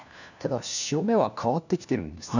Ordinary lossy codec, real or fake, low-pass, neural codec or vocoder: none; fake; 7.2 kHz; codec, 16 kHz, 1 kbps, FunCodec, trained on LibriTTS, 50 frames a second